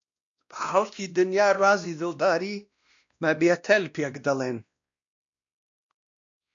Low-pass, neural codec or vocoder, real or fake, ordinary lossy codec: 7.2 kHz; codec, 16 kHz, 1 kbps, X-Codec, WavLM features, trained on Multilingual LibriSpeech; fake; MP3, 64 kbps